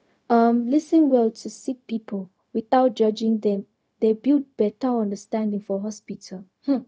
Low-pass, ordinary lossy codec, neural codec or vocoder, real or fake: none; none; codec, 16 kHz, 0.4 kbps, LongCat-Audio-Codec; fake